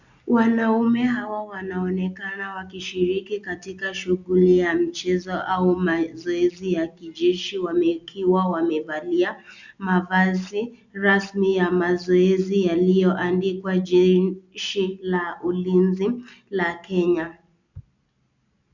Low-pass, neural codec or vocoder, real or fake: 7.2 kHz; none; real